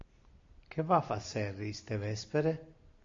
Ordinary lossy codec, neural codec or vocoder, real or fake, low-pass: AAC, 48 kbps; none; real; 7.2 kHz